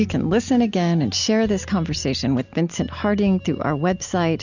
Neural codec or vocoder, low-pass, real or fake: none; 7.2 kHz; real